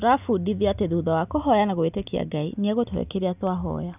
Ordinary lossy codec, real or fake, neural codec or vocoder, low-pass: none; real; none; 3.6 kHz